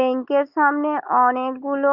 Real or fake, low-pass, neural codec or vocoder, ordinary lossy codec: real; 5.4 kHz; none; Opus, 24 kbps